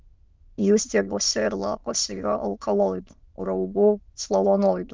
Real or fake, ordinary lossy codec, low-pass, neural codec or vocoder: fake; Opus, 24 kbps; 7.2 kHz; autoencoder, 22.05 kHz, a latent of 192 numbers a frame, VITS, trained on many speakers